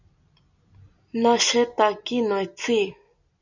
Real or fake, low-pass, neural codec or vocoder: real; 7.2 kHz; none